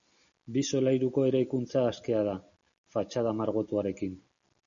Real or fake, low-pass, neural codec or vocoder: real; 7.2 kHz; none